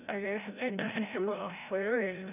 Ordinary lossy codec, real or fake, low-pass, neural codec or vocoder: none; fake; 3.6 kHz; codec, 16 kHz, 0.5 kbps, FreqCodec, larger model